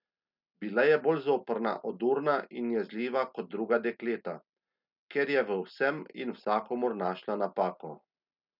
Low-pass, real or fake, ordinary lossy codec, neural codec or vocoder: 5.4 kHz; real; none; none